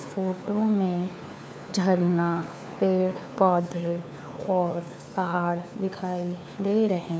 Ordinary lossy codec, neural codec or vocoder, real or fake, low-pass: none; codec, 16 kHz, 4 kbps, FunCodec, trained on LibriTTS, 50 frames a second; fake; none